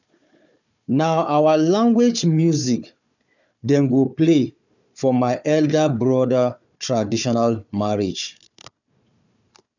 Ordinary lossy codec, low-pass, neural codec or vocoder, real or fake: none; 7.2 kHz; codec, 16 kHz, 4 kbps, FunCodec, trained on Chinese and English, 50 frames a second; fake